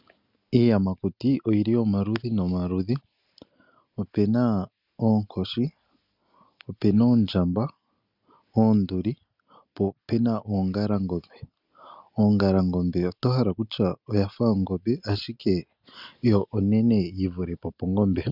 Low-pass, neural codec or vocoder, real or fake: 5.4 kHz; none; real